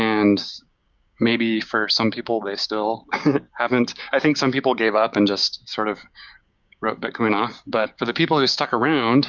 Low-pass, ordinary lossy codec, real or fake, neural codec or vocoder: 7.2 kHz; Opus, 64 kbps; fake; codec, 16 kHz, 6 kbps, DAC